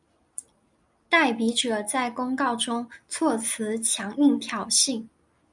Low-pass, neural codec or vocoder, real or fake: 10.8 kHz; none; real